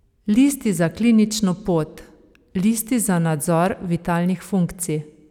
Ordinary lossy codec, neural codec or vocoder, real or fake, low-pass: none; none; real; 19.8 kHz